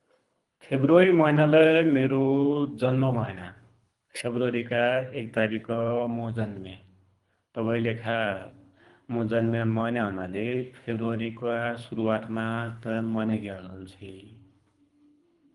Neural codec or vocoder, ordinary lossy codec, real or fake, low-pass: codec, 24 kHz, 3 kbps, HILCodec; Opus, 32 kbps; fake; 10.8 kHz